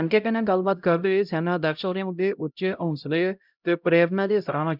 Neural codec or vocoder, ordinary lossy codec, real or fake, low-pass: codec, 16 kHz, 0.5 kbps, X-Codec, HuBERT features, trained on LibriSpeech; none; fake; 5.4 kHz